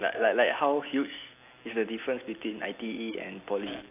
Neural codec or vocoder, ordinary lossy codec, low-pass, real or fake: none; AAC, 24 kbps; 3.6 kHz; real